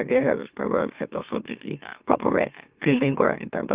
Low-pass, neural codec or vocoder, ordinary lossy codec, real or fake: 3.6 kHz; autoencoder, 44.1 kHz, a latent of 192 numbers a frame, MeloTTS; Opus, 24 kbps; fake